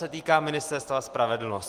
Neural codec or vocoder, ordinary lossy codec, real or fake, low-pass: none; Opus, 24 kbps; real; 14.4 kHz